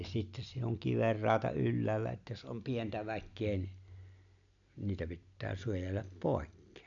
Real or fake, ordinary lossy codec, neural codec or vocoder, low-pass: real; none; none; 7.2 kHz